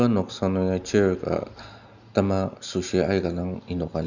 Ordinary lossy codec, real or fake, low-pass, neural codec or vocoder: none; real; 7.2 kHz; none